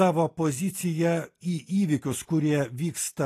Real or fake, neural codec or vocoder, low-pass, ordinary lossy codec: real; none; 14.4 kHz; AAC, 48 kbps